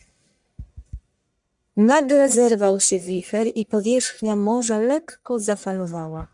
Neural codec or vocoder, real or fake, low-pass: codec, 44.1 kHz, 1.7 kbps, Pupu-Codec; fake; 10.8 kHz